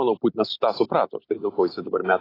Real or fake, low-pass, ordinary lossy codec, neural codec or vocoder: real; 5.4 kHz; AAC, 24 kbps; none